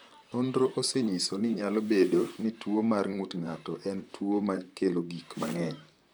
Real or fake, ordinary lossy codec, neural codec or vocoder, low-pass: fake; none; vocoder, 44.1 kHz, 128 mel bands, Pupu-Vocoder; none